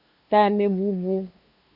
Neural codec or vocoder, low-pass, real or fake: codec, 16 kHz, 2 kbps, FunCodec, trained on LibriTTS, 25 frames a second; 5.4 kHz; fake